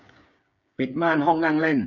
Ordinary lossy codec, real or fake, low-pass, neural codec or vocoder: none; fake; 7.2 kHz; codec, 16 kHz, 8 kbps, FreqCodec, smaller model